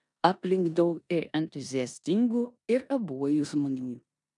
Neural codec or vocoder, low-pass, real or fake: codec, 16 kHz in and 24 kHz out, 0.9 kbps, LongCat-Audio-Codec, four codebook decoder; 10.8 kHz; fake